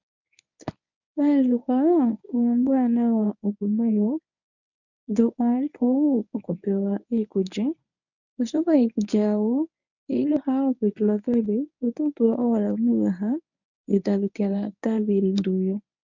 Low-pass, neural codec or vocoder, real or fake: 7.2 kHz; codec, 24 kHz, 0.9 kbps, WavTokenizer, medium speech release version 1; fake